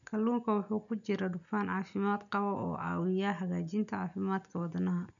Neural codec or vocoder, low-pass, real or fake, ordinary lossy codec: none; 7.2 kHz; real; none